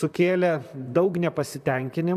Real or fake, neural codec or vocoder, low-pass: fake; vocoder, 44.1 kHz, 128 mel bands, Pupu-Vocoder; 14.4 kHz